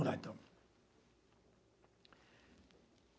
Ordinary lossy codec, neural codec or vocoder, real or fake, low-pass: none; none; real; none